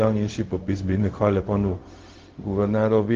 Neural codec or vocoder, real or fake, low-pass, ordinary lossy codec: codec, 16 kHz, 0.4 kbps, LongCat-Audio-Codec; fake; 7.2 kHz; Opus, 16 kbps